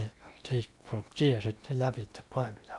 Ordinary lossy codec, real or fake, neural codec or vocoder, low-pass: none; fake; codec, 16 kHz in and 24 kHz out, 0.8 kbps, FocalCodec, streaming, 65536 codes; 10.8 kHz